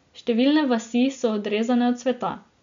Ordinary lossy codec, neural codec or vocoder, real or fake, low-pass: MP3, 64 kbps; none; real; 7.2 kHz